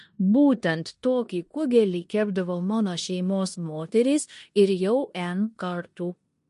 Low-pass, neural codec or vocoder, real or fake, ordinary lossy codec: 10.8 kHz; codec, 16 kHz in and 24 kHz out, 0.9 kbps, LongCat-Audio-Codec, four codebook decoder; fake; MP3, 48 kbps